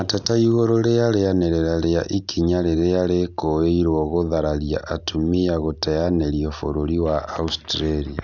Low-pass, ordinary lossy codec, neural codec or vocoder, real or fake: 7.2 kHz; none; none; real